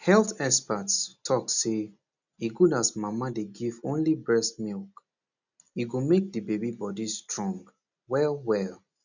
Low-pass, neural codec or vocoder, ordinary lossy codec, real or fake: 7.2 kHz; none; none; real